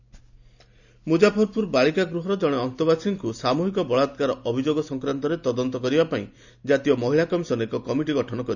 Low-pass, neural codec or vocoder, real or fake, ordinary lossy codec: 7.2 kHz; none; real; none